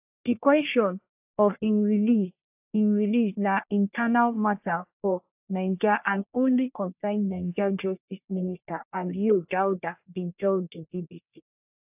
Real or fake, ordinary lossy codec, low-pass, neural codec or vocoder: fake; AAC, 32 kbps; 3.6 kHz; codec, 44.1 kHz, 1.7 kbps, Pupu-Codec